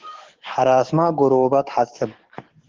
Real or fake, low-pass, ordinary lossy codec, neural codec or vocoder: fake; 7.2 kHz; Opus, 16 kbps; codec, 44.1 kHz, 7.8 kbps, DAC